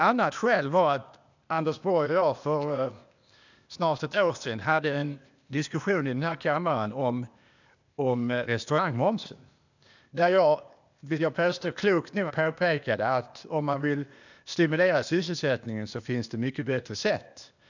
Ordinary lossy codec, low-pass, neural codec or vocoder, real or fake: none; 7.2 kHz; codec, 16 kHz, 0.8 kbps, ZipCodec; fake